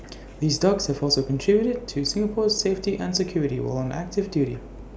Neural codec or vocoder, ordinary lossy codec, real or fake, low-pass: none; none; real; none